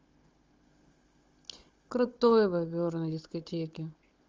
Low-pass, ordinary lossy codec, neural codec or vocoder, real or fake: 7.2 kHz; Opus, 32 kbps; codec, 16 kHz, 16 kbps, FunCodec, trained on Chinese and English, 50 frames a second; fake